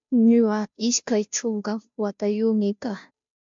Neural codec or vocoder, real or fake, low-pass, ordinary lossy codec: codec, 16 kHz, 0.5 kbps, FunCodec, trained on Chinese and English, 25 frames a second; fake; 7.2 kHz; MP3, 64 kbps